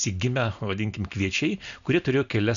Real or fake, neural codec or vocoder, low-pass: real; none; 7.2 kHz